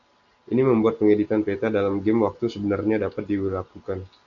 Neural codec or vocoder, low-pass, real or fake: none; 7.2 kHz; real